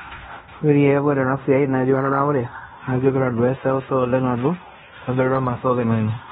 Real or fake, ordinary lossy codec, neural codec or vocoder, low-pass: fake; AAC, 16 kbps; codec, 16 kHz in and 24 kHz out, 0.9 kbps, LongCat-Audio-Codec, fine tuned four codebook decoder; 10.8 kHz